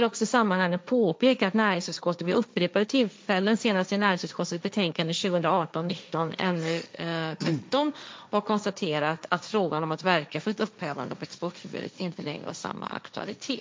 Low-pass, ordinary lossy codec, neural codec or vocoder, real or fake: none; none; codec, 16 kHz, 1.1 kbps, Voila-Tokenizer; fake